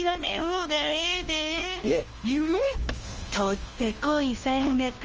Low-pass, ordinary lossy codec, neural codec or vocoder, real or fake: 7.2 kHz; Opus, 24 kbps; codec, 16 kHz, 1 kbps, FunCodec, trained on LibriTTS, 50 frames a second; fake